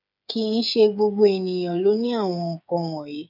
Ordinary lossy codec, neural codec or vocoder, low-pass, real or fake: none; codec, 16 kHz, 8 kbps, FreqCodec, smaller model; 5.4 kHz; fake